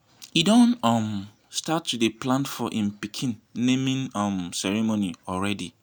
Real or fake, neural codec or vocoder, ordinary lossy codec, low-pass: real; none; none; none